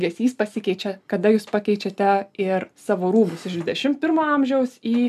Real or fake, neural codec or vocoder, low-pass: real; none; 14.4 kHz